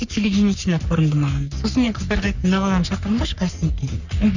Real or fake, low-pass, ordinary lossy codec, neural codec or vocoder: fake; 7.2 kHz; none; codec, 44.1 kHz, 3.4 kbps, Pupu-Codec